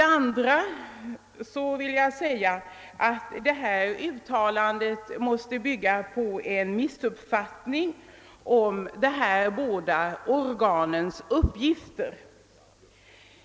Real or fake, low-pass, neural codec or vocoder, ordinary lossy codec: real; none; none; none